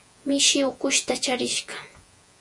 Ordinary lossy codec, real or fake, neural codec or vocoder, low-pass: Opus, 64 kbps; fake; vocoder, 48 kHz, 128 mel bands, Vocos; 10.8 kHz